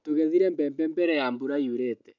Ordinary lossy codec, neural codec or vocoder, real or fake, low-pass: none; none; real; 7.2 kHz